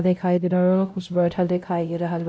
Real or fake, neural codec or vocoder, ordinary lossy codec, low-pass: fake; codec, 16 kHz, 0.5 kbps, X-Codec, WavLM features, trained on Multilingual LibriSpeech; none; none